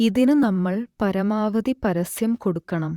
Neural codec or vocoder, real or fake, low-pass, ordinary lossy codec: vocoder, 44.1 kHz, 128 mel bands, Pupu-Vocoder; fake; 19.8 kHz; Opus, 64 kbps